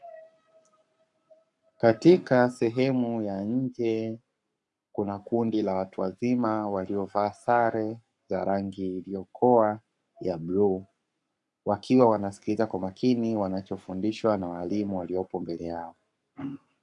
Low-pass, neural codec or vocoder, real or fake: 10.8 kHz; codec, 44.1 kHz, 7.8 kbps, Pupu-Codec; fake